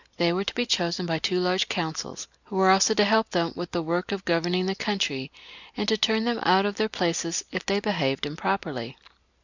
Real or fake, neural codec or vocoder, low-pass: real; none; 7.2 kHz